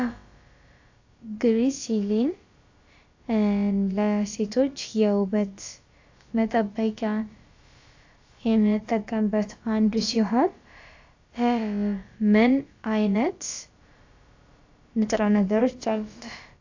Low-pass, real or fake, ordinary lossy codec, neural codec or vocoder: 7.2 kHz; fake; AAC, 48 kbps; codec, 16 kHz, about 1 kbps, DyCAST, with the encoder's durations